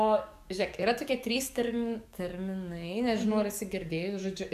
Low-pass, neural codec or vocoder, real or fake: 14.4 kHz; codec, 44.1 kHz, 7.8 kbps, DAC; fake